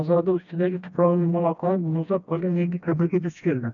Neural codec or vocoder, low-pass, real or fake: codec, 16 kHz, 1 kbps, FreqCodec, smaller model; 7.2 kHz; fake